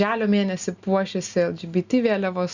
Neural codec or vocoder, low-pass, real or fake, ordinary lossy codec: none; 7.2 kHz; real; Opus, 64 kbps